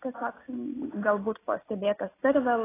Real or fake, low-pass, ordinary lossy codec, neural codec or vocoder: real; 3.6 kHz; AAC, 16 kbps; none